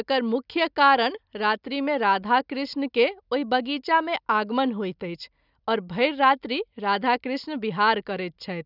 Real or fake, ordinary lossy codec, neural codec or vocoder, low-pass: real; none; none; 5.4 kHz